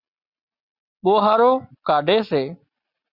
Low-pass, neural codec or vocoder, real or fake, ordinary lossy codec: 5.4 kHz; none; real; Opus, 64 kbps